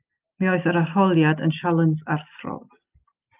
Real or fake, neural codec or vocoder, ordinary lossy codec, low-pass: real; none; Opus, 24 kbps; 3.6 kHz